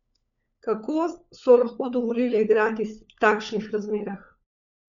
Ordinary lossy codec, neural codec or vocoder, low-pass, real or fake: none; codec, 16 kHz, 4 kbps, FunCodec, trained on LibriTTS, 50 frames a second; 7.2 kHz; fake